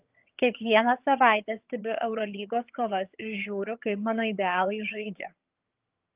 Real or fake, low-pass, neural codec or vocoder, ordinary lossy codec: fake; 3.6 kHz; vocoder, 22.05 kHz, 80 mel bands, HiFi-GAN; Opus, 32 kbps